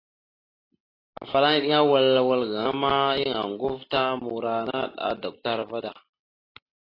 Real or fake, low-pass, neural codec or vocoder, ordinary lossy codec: real; 5.4 kHz; none; AAC, 24 kbps